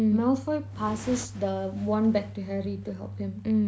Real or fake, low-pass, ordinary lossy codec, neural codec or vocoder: real; none; none; none